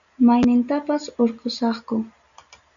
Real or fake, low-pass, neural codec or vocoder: real; 7.2 kHz; none